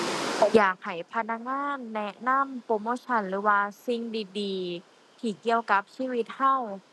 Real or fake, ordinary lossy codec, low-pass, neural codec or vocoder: real; none; none; none